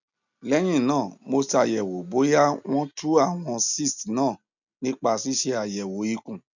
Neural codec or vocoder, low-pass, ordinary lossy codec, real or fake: none; 7.2 kHz; none; real